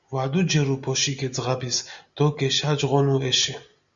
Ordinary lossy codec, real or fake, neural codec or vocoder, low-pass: Opus, 64 kbps; real; none; 7.2 kHz